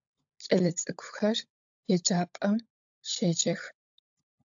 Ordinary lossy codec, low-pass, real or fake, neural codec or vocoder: AAC, 64 kbps; 7.2 kHz; fake; codec, 16 kHz, 16 kbps, FunCodec, trained on LibriTTS, 50 frames a second